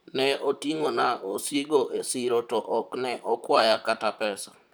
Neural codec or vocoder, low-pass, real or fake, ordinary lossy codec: vocoder, 44.1 kHz, 128 mel bands, Pupu-Vocoder; none; fake; none